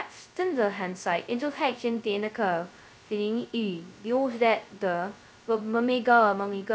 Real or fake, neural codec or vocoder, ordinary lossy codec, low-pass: fake; codec, 16 kHz, 0.2 kbps, FocalCodec; none; none